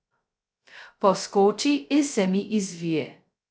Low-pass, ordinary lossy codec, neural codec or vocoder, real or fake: none; none; codec, 16 kHz, 0.2 kbps, FocalCodec; fake